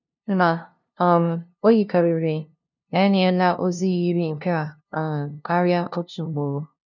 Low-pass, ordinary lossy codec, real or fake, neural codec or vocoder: 7.2 kHz; none; fake; codec, 16 kHz, 0.5 kbps, FunCodec, trained on LibriTTS, 25 frames a second